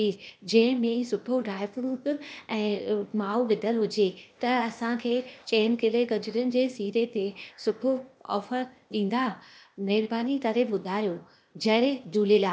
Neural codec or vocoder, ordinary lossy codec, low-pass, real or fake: codec, 16 kHz, 0.8 kbps, ZipCodec; none; none; fake